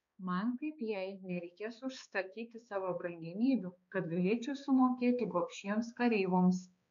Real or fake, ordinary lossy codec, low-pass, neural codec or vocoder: fake; MP3, 64 kbps; 7.2 kHz; codec, 16 kHz, 2 kbps, X-Codec, HuBERT features, trained on balanced general audio